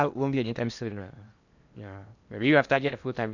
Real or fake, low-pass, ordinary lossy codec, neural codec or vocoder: fake; 7.2 kHz; none; codec, 16 kHz in and 24 kHz out, 0.8 kbps, FocalCodec, streaming, 65536 codes